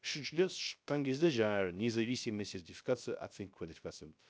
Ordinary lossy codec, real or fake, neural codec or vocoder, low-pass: none; fake; codec, 16 kHz, 0.3 kbps, FocalCodec; none